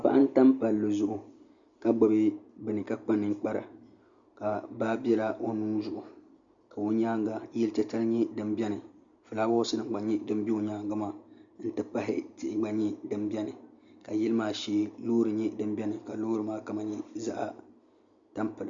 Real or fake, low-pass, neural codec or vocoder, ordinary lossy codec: real; 7.2 kHz; none; Opus, 64 kbps